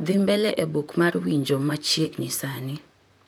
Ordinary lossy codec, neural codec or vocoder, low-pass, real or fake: none; vocoder, 44.1 kHz, 128 mel bands, Pupu-Vocoder; none; fake